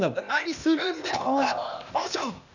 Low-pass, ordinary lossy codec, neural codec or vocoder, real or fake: 7.2 kHz; none; codec, 16 kHz, 0.8 kbps, ZipCodec; fake